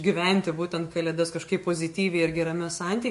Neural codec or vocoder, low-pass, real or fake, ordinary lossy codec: autoencoder, 48 kHz, 128 numbers a frame, DAC-VAE, trained on Japanese speech; 14.4 kHz; fake; MP3, 48 kbps